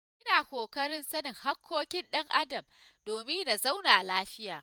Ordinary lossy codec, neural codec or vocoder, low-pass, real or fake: none; vocoder, 48 kHz, 128 mel bands, Vocos; none; fake